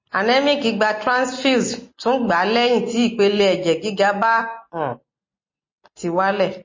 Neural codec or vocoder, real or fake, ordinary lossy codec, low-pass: none; real; MP3, 32 kbps; 7.2 kHz